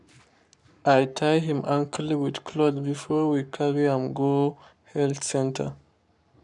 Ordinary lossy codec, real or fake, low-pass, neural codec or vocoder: none; fake; 10.8 kHz; codec, 44.1 kHz, 7.8 kbps, Pupu-Codec